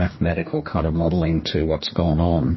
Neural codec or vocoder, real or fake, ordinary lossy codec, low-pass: codec, 44.1 kHz, 2.6 kbps, DAC; fake; MP3, 24 kbps; 7.2 kHz